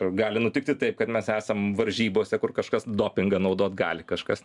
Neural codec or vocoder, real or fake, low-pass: none; real; 10.8 kHz